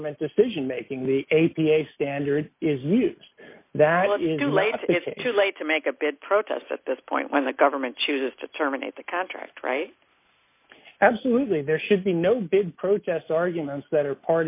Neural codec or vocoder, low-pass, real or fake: none; 3.6 kHz; real